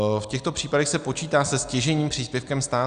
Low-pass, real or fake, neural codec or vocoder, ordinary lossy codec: 9.9 kHz; real; none; Opus, 64 kbps